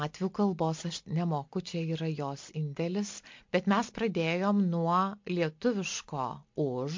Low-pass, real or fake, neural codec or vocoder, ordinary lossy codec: 7.2 kHz; real; none; MP3, 48 kbps